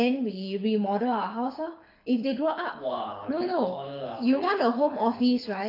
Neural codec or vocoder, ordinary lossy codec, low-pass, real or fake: codec, 24 kHz, 6 kbps, HILCodec; AAC, 32 kbps; 5.4 kHz; fake